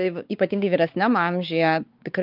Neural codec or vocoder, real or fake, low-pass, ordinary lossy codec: codec, 16 kHz, 4 kbps, X-Codec, WavLM features, trained on Multilingual LibriSpeech; fake; 5.4 kHz; Opus, 24 kbps